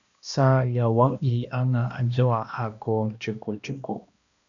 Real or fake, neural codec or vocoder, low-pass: fake; codec, 16 kHz, 1 kbps, X-Codec, HuBERT features, trained on balanced general audio; 7.2 kHz